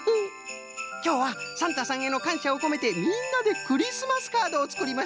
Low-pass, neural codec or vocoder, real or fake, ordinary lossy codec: none; none; real; none